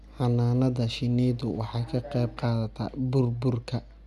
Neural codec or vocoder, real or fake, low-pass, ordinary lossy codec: none; real; 14.4 kHz; none